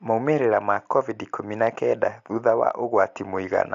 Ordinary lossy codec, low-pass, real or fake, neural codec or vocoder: AAC, 64 kbps; 7.2 kHz; real; none